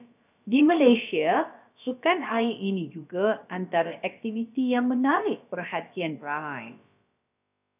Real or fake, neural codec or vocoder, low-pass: fake; codec, 16 kHz, about 1 kbps, DyCAST, with the encoder's durations; 3.6 kHz